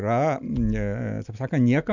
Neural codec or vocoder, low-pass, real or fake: none; 7.2 kHz; real